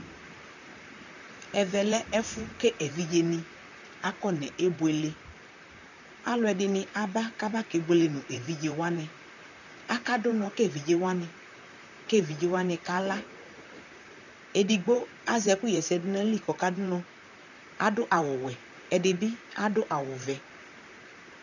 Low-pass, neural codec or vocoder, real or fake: 7.2 kHz; vocoder, 44.1 kHz, 128 mel bands, Pupu-Vocoder; fake